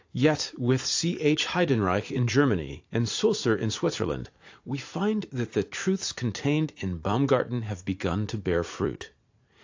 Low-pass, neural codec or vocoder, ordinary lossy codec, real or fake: 7.2 kHz; vocoder, 44.1 kHz, 80 mel bands, Vocos; AAC, 48 kbps; fake